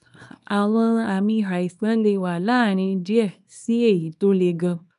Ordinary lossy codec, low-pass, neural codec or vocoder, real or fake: none; 10.8 kHz; codec, 24 kHz, 0.9 kbps, WavTokenizer, small release; fake